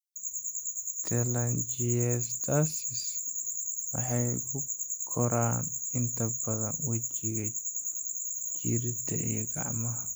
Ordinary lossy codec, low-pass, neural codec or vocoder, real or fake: none; none; none; real